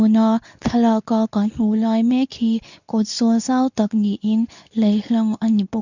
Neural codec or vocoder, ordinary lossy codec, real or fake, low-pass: codec, 24 kHz, 0.9 kbps, WavTokenizer, medium speech release version 2; none; fake; 7.2 kHz